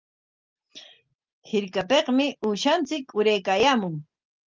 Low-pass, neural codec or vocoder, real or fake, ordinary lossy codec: 7.2 kHz; none; real; Opus, 32 kbps